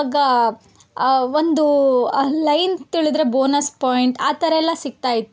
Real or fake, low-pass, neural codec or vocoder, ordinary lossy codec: real; none; none; none